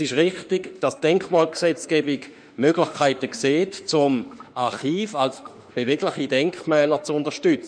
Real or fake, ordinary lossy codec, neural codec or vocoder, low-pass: fake; none; autoencoder, 48 kHz, 32 numbers a frame, DAC-VAE, trained on Japanese speech; 9.9 kHz